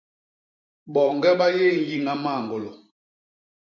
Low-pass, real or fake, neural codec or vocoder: 7.2 kHz; fake; vocoder, 44.1 kHz, 128 mel bands every 512 samples, BigVGAN v2